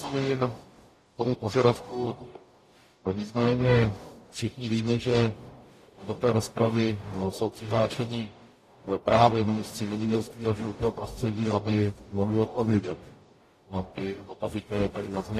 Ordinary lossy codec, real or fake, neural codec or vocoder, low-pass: AAC, 48 kbps; fake; codec, 44.1 kHz, 0.9 kbps, DAC; 14.4 kHz